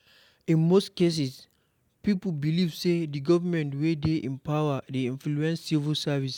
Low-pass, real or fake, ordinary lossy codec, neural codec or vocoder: 19.8 kHz; real; none; none